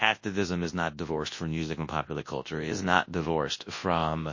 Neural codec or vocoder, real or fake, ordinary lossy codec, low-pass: codec, 24 kHz, 0.9 kbps, WavTokenizer, large speech release; fake; MP3, 32 kbps; 7.2 kHz